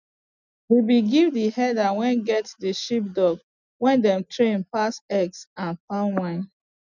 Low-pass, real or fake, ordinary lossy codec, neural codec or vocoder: 7.2 kHz; real; none; none